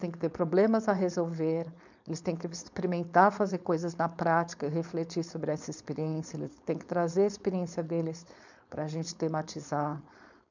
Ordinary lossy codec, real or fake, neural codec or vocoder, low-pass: none; fake; codec, 16 kHz, 4.8 kbps, FACodec; 7.2 kHz